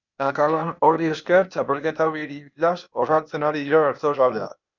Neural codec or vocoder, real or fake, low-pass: codec, 16 kHz, 0.8 kbps, ZipCodec; fake; 7.2 kHz